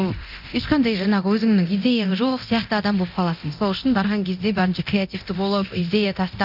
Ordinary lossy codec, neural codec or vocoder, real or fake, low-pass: none; codec, 24 kHz, 0.9 kbps, DualCodec; fake; 5.4 kHz